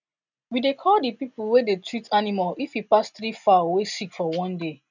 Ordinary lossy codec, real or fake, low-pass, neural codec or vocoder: none; real; 7.2 kHz; none